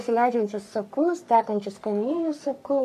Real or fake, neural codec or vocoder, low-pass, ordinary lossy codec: fake; codec, 44.1 kHz, 3.4 kbps, Pupu-Codec; 14.4 kHz; AAC, 96 kbps